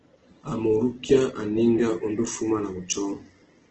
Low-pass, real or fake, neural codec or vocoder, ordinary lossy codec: 7.2 kHz; real; none; Opus, 16 kbps